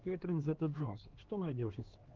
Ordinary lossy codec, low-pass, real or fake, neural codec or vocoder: Opus, 16 kbps; 7.2 kHz; fake; codec, 16 kHz, 2 kbps, X-Codec, HuBERT features, trained on LibriSpeech